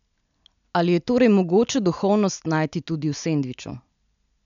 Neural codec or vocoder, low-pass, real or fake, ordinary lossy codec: none; 7.2 kHz; real; none